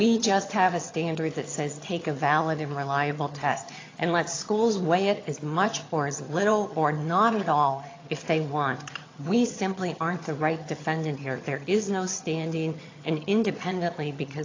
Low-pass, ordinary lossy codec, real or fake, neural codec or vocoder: 7.2 kHz; AAC, 32 kbps; fake; vocoder, 22.05 kHz, 80 mel bands, HiFi-GAN